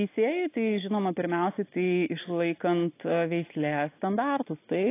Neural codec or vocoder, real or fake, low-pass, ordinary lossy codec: none; real; 3.6 kHz; AAC, 24 kbps